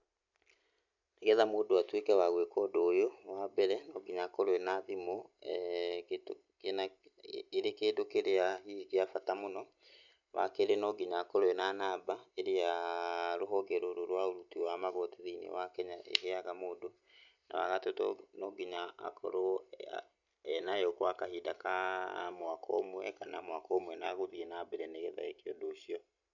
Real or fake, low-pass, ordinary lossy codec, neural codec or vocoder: real; 7.2 kHz; none; none